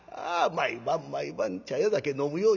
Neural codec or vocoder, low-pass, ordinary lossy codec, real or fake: none; 7.2 kHz; none; real